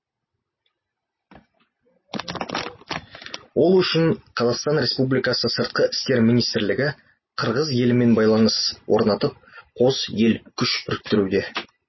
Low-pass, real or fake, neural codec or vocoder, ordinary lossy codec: 7.2 kHz; real; none; MP3, 24 kbps